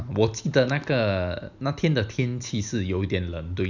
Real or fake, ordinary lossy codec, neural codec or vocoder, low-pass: real; none; none; 7.2 kHz